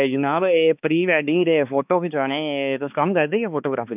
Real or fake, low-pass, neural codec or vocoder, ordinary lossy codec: fake; 3.6 kHz; codec, 16 kHz, 2 kbps, X-Codec, HuBERT features, trained on balanced general audio; none